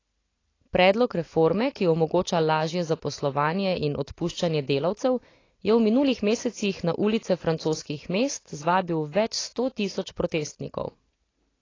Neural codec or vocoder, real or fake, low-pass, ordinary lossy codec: none; real; 7.2 kHz; AAC, 32 kbps